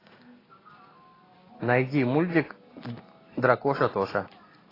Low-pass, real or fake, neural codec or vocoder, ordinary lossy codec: 5.4 kHz; real; none; AAC, 24 kbps